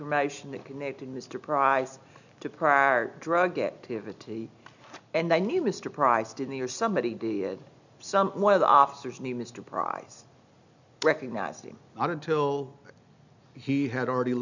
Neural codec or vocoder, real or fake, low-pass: none; real; 7.2 kHz